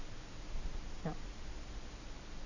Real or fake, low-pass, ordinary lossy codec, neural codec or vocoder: real; 7.2 kHz; none; none